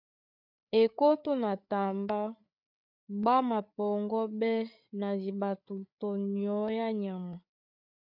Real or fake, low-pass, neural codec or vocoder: fake; 5.4 kHz; codec, 16 kHz, 8 kbps, FreqCodec, larger model